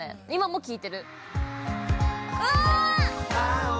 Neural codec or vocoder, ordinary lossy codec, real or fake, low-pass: none; none; real; none